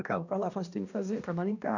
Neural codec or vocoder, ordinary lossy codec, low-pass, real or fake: codec, 16 kHz, 1 kbps, X-Codec, HuBERT features, trained on balanced general audio; none; 7.2 kHz; fake